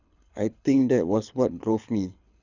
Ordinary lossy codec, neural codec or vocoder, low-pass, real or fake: none; codec, 24 kHz, 6 kbps, HILCodec; 7.2 kHz; fake